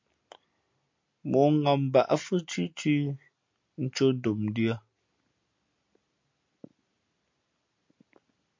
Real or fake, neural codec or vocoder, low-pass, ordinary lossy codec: real; none; 7.2 kHz; MP3, 48 kbps